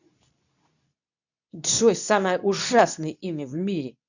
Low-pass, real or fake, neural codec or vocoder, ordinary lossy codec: 7.2 kHz; fake; codec, 24 kHz, 0.9 kbps, WavTokenizer, medium speech release version 2; none